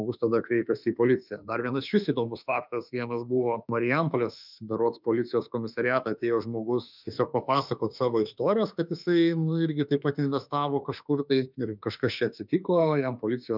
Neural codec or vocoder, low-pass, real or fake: autoencoder, 48 kHz, 32 numbers a frame, DAC-VAE, trained on Japanese speech; 5.4 kHz; fake